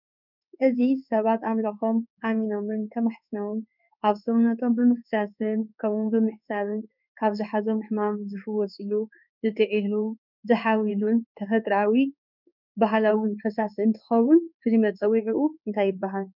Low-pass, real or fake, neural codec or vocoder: 5.4 kHz; fake; codec, 16 kHz in and 24 kHz out, 1 kbps, XY-Tokenizer